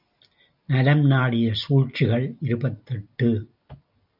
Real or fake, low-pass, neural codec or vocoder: real; 5.4 kHz; none